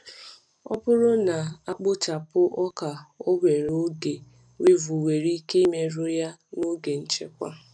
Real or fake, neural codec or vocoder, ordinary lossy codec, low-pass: real; none; none; 9.9 kHz